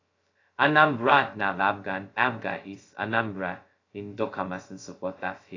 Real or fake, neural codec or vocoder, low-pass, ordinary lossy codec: fake; codec, 16 kHz, 0.2 kbps, FocalCodec; 7.2 kHz; AAC, 32 kbps